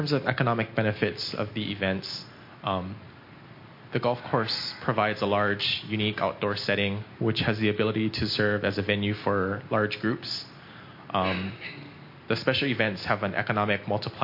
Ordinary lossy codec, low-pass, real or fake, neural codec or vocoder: MP3, 32 kbps; 5.4 kHz; real; none